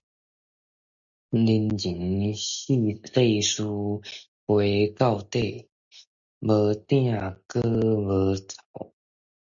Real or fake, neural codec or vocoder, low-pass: real; none; 7.2 kHz